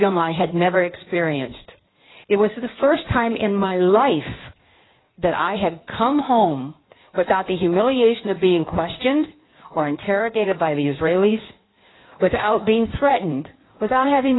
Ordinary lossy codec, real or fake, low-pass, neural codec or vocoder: AAC, 16 kbps; fake; 7.2 kHz; codec, 16 kHz in and 24 kHz out, 1.1 kbps, FireRedTTS-2 codec